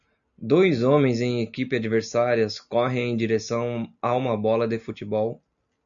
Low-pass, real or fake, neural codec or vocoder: 7.2 kHz; real; none